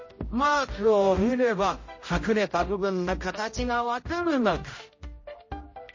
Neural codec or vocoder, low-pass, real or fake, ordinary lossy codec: codec, 16 kHz, 0.5 kbps, X-Codec, HuBERT features, trained on general audio; 7.2 kHz; fake; MP3, 32 kbps